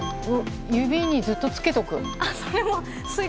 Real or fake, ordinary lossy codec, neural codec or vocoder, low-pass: real; none; none; none